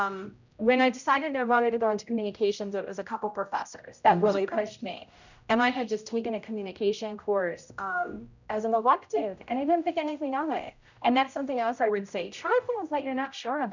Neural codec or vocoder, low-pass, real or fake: codec, 16 kHz, 0.5 kbps, X-Codec, HuBERT features, trained on general audio; 7.2 kHz; fake